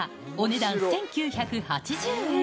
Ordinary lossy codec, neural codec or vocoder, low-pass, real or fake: none; none; none; real